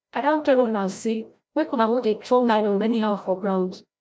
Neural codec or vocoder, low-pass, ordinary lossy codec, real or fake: codec, 16 kHz, 0.5 kbps, FreqCodec, larger model; none; none; fake